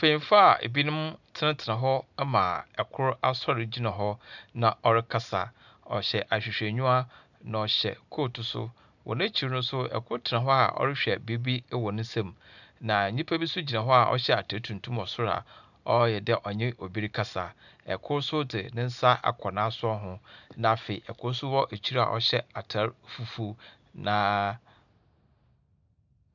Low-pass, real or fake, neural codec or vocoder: 7.2 kHz; real; none